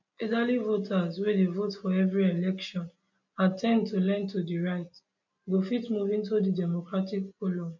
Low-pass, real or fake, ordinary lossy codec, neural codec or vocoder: 7.2 kHz; real; none; none